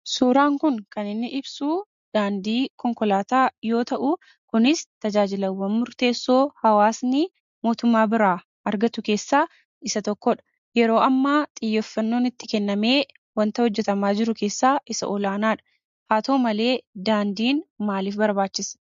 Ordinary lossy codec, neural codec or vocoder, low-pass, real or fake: MP3, 48 kbps; none; 7.2 kHz; real